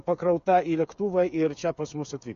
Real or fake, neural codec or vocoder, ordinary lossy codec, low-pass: fake; codec, 16 kHz, 8 kbps, FreqCodec, smaller model; MP3, 48 kbps; 7.2 kHz